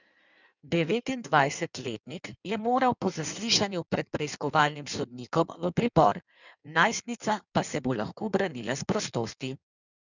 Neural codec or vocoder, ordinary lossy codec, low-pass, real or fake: codec, 16 kHz in and 24 kHz out, 1.1 kbps, FireRedTTS-2 codec; none; 7.2 kHz; fake